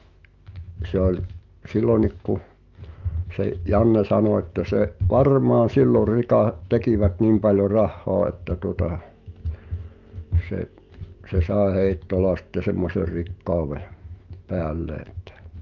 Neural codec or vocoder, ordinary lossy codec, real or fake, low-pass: autoencoder, 48 kHz, 128 numbers a frame, DAC-VAE, trained on Japanese speech; Opus, 24 kbps; fake; 7.2 kHz